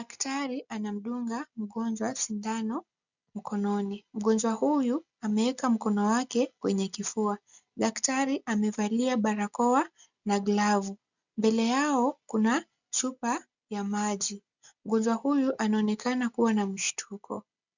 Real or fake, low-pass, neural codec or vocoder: real; 7.2 kHz; none